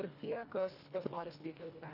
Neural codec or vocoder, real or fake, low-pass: codec, 24 kHz, 1.5 kbps, HILCodec; fake; 5.4 kHz